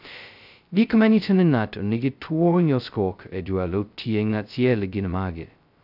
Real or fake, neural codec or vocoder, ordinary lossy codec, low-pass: fake; codec, 16 kHz, 0.2 kbps, FocalCodec; none; 5.4 kHz